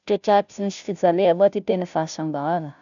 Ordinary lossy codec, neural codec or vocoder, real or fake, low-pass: none; codec, 16 kHz, 0.5 kbps, FunCodec, trained on Chinese and English, 25 frames a second; fake; 7.2 kHz